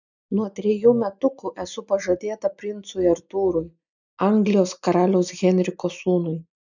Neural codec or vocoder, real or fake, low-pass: none; real; 7.2 kHz